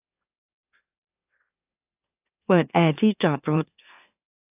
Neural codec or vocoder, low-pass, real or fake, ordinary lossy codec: autoencoder, 44.1 kHz, a latent of 192 numbers a frame, MeloTTS; 3.6 kHz; fake; AAC, 32 kbps